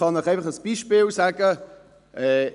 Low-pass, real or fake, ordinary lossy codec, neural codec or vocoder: 10.8 kHz; real; none; none